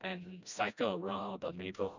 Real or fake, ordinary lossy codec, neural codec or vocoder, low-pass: fake; none; codec, 16 kHz, 1 kbps, FreqCodec, smaller model; 7.2 kHz